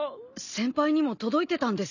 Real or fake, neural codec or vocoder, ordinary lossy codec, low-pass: real; none; none; 7.2 kHz